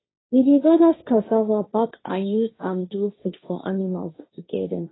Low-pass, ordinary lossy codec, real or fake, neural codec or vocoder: 7.2 kHz; AAC, 16 kbps; fake; codec, 16 kHz, 1.1 kbps, Voila-Tokenizer